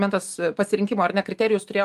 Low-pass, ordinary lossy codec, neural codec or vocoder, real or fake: 14.4 kHz; Opus, 32 kbps; none; real